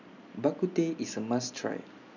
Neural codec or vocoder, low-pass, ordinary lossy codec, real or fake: none; 7.2 kHz; none; real